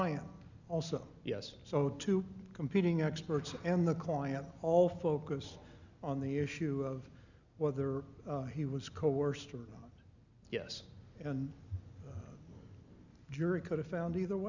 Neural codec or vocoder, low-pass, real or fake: none; 7.2 kHz; real